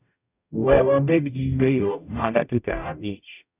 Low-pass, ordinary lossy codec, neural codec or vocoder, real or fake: 3.6 kHz; none; codec, 44.1 kHz, 0.9 kbps, DAC; fake